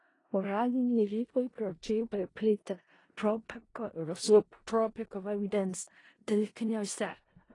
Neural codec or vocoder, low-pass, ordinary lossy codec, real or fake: codec, 16 kHz in and 24 kHz out, 0.4 kbps, LongCat-Audio-Codec, four codebook decoder; 10.8 kHz; AAC, 32 kbps; fake